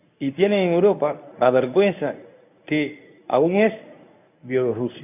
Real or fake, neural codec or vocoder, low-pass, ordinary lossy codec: fake; codec, 24 kHz, 0.9 kbps, WavTokenizer, medium speech release version 1; 3.6 kHz; none